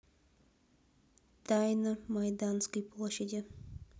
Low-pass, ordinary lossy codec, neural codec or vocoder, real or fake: none; none; none; real